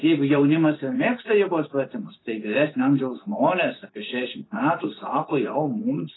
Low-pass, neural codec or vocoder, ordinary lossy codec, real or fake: 7.2 kHz; vocoder, 24 kHz, 100 mel bands, Vocos; AAC, 16 kbps; fake